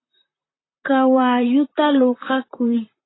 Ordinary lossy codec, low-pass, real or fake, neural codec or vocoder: AAC, 16 kbps; 7.2 kHz; fake; vocoder, 44.1 kHz, 128 mel bands, Pupu-Vocoder